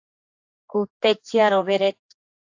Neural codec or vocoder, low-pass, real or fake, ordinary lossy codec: codec, 16 kHz, 4 kbps, X-Codec, HuBERT features, trained on general audio; 7.2 kHz; fake; AAC, 48 kbps